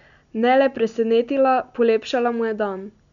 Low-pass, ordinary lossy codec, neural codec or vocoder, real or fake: 7.2 kHz; none; none; real